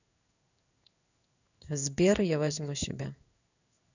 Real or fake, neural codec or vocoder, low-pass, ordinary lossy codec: fake; codec, 16 kHz in and 24 kHz out, 1 kbps, XY-Tokenizer; 7.2 kHz; none